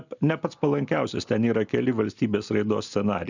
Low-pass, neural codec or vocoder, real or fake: 7.2 kHz; none; real